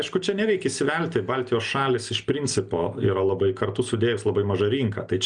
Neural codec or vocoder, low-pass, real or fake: none; 9.9 kHz; real